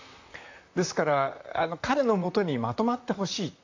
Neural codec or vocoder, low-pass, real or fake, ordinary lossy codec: vocoder, 44.1 kHz, 128 mel bands, Pupu-Vocoder; 7.2 kHz; fake; AAC, 48 kbps